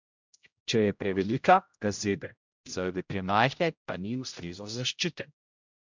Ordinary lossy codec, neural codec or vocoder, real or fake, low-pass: MP3, 64 kbps; codec, 16 kHz, 0.5 kbps, X-Codec, HuBERT features, trained on general audio; fake; 7.2 kHz